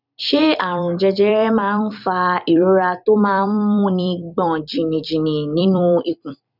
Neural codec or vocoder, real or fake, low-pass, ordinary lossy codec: vocoder, 44.1 kHz, 128 mel bands every 256 samples, BigVGAN v2; fake; 5.4 kHz; none